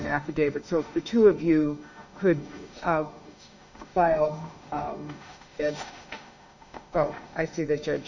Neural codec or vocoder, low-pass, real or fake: autoencoder, 48 kHz, 32 numbers a frame, DAC-VAE, trained on Japanese speech; 7.2 kHz; fake